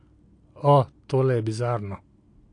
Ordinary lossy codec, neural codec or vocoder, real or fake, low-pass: AAC, 48 kbps; none; real; 9.9 kHz